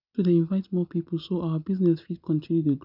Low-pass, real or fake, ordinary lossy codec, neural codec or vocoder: 5.4 kHz; real; none; none